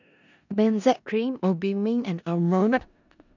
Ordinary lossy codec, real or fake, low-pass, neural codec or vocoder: none; fake; 7.2 kHz; codec, 16 kHz in and 24 kHz out, 0.4 kbps, LongCat-Audio-Codec, four codebook decoder